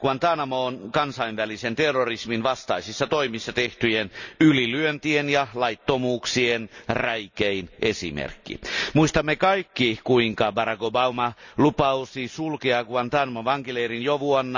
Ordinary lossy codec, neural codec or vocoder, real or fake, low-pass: none; none; real; 7.2 kHz